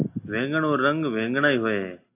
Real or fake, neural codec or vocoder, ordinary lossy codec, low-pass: real; none; AAC, 24 kbps; 3.6 kHz